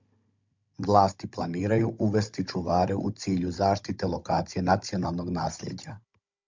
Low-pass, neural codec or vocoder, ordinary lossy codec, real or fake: 7.2 kHz; codec, 16 kHz, 16 kbps, FunCodec, trained on Chinese and English, 50 frames a second; MP3, 64 kbps; fake